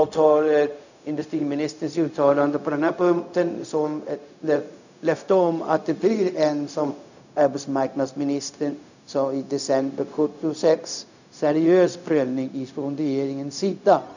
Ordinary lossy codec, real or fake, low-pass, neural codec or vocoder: none; fake; 7.2 kHz; codec, 16 kHz, 0.4 kbps, LongCat-Audio-Codec